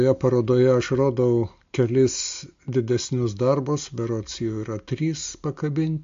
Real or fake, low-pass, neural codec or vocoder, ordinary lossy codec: real; 7.2 kHz; none; MP3, 48 kbps